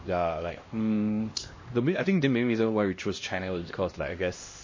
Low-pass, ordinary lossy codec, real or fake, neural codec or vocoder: 7.2 kHz; MP3, 32 kbps; fake; codec, 16 kHz, 1 kbps, X-Codec, HuBERT features, trained on LibriSpeech